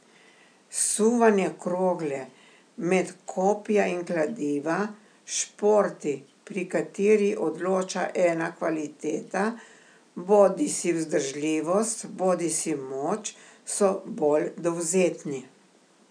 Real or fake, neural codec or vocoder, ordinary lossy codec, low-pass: real; none; none; 9.9 kHz